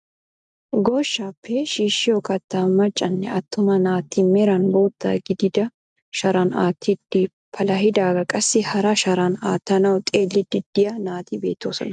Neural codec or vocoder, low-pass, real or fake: none; 10.8 kHz; real